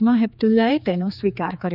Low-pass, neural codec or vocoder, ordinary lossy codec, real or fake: 5.4 kHz; codec, 16 kHz, 4 kbps, X-Codec, HuBERT features, trained on general audio; none; fake